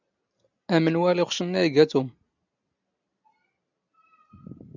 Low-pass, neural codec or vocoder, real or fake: 7.2 kHz; none; real